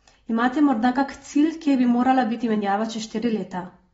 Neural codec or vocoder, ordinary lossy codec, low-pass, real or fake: none; AAC, 24 kbps; 10.8 kHz; real